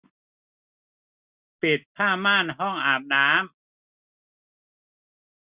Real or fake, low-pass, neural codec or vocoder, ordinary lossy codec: real; 3.6 kHz; none; Opus, 64 kbps